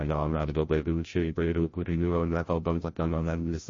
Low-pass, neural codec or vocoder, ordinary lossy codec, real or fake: 7.2 kHz; codec, 16 kHz, 0.5 kbps, FreqCodec, larger model; MP3, 32 kbps; fake